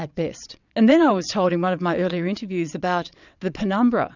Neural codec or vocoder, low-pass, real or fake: vocoder, 22.05 kHz, 80 mel bands, WaveNeXt; 7.2 kHz; fake